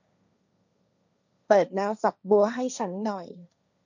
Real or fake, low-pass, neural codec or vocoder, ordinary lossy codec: fake; 7.2 kHz; codec, 16 kHz, 1.1 kbps, Voila-Tokenizer; none